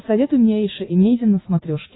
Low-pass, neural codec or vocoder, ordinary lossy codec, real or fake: 7.2 kHz; none; AAC, 16 kbps; real